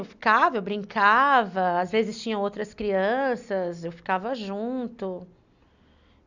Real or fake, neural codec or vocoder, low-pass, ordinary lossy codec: real; none; 7.2 kHz; none